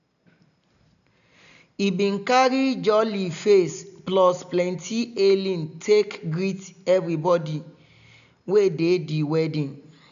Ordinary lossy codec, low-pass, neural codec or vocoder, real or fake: none; 7.2 kHz; none; real